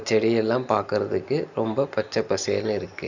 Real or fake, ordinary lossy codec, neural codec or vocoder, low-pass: real; none; none; 7.2 kHz